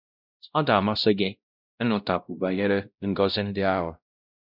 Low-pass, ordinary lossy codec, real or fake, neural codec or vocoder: 5.4 kHz; none; fake; codec, 16 kHz, 0.5 kbps, X-Codec, WavLM features, trained on Multilingual LibriSpeech